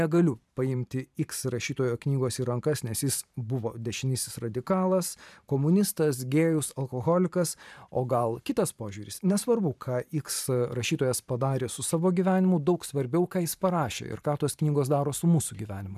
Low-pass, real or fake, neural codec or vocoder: 14.4 kHz; fake; vocoder, 44.1 kHz, 128 mel bands every 512 samples, BigVGAN v2